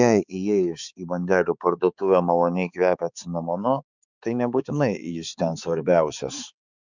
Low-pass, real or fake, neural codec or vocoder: 7.2 kHz; fake; codec, 16 kHz, 4 kbps, X-Codec, HuBERT features, trained on balanced general audio